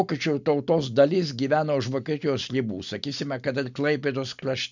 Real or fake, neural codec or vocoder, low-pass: real; none; 7.2 kHz